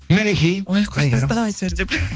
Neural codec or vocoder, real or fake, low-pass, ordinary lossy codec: codec, 16 kHz, 2 kbps, X-Codec, HuBERT features, trained on balanced general audio; fake; none; none